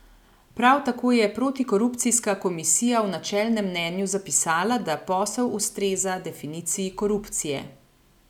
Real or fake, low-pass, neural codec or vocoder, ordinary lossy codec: real; 19.8 kHz; none; none